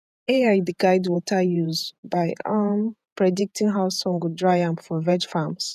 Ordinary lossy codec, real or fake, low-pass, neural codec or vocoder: none; fake; 14.4 kHz; vocoder, 48 kHz, 128 mel bands, Vocos